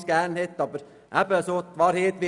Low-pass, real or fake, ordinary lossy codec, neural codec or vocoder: 10.8 kHz; real; none; none